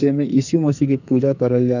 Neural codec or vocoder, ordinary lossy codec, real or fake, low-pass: codec, 44.1 kHz, 2.6 kbps, SNAC; none; fake; 7.2 kHz